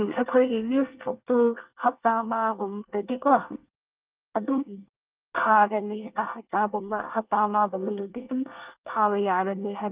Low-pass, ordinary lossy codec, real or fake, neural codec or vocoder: 3.6 kHz; Opus, 32 kbps; fake; codec, 24 kHz, 1 kbps, SNAC